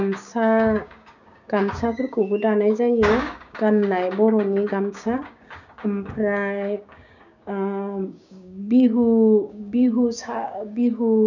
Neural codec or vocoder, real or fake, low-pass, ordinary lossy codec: codec, 16 kHz, 6 kbps, DAC; fake; 7.2 kHz; none